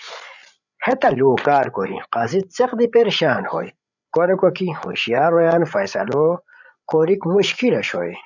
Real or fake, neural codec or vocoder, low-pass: fake; codec, 16 kHz, 8 kbps, FreqCodec, larger model; 7.2 kHz